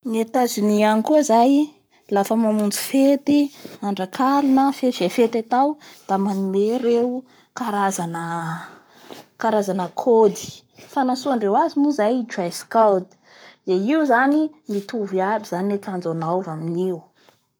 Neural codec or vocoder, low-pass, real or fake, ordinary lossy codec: codec, 44.1 kHz, 7.8 kbps, Pupu-Codec; none; fake; none